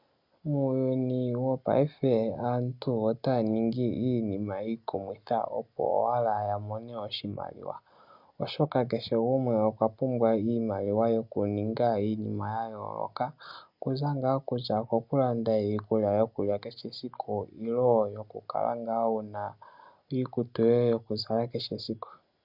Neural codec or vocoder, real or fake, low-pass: none; real; 5.4 kHz